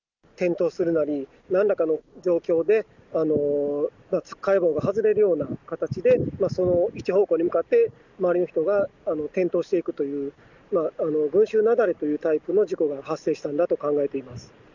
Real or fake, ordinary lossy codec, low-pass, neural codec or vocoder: real; none; 7.2 kHz; none